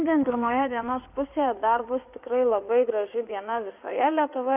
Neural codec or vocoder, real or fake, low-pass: codec, 16 kHz in and 24 kHz out, 2.2 kbps, FireRedTTS-2 codec; fake; 3.6 kHz